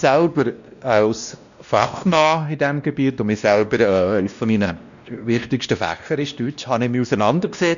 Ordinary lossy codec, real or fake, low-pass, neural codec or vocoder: none; fake; 7.2 kHz; codec, 16 kHz, 1 kbps, X-Codec, WavLM features, trained on Multilingual LibriSpeech